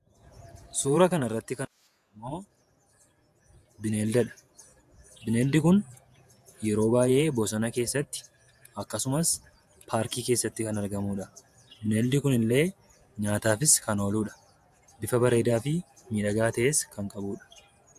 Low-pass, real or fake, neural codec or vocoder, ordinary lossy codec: 14.4 kHz; fake; vocoder, 48 kHz, 128 mel bands, Vocos; AAC, 96 kbps